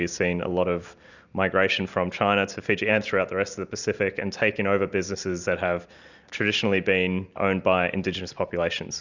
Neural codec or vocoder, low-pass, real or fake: none; 7.2 kHz; real